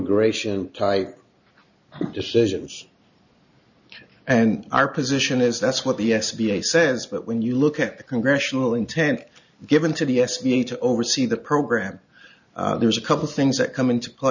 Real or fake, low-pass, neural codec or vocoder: real; 7.2 kHz; none